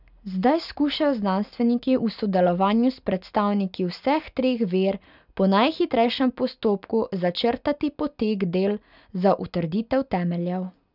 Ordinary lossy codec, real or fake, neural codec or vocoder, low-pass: none; real; none; 5.4 kHz